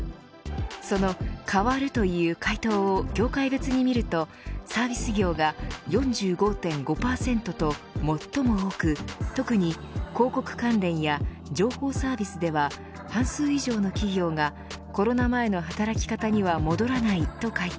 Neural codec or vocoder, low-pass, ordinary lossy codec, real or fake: none; none; none; real